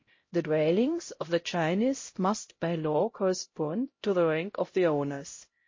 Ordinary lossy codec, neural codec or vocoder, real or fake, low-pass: MP3, 32 kbps; codec, 16 kHz, 0.5 kbps, X-Codec, HuBERT features, trained on LibriSpeech; fake; 7.2 kHz